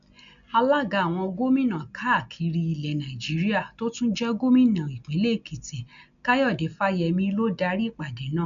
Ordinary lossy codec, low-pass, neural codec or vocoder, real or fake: none; 7.2 kHz; none; real